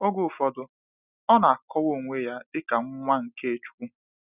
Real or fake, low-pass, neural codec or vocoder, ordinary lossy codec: real; 3.6 kHz; none; none